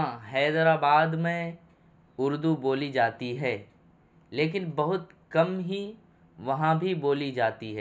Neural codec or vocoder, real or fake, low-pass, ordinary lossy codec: none; real; none; none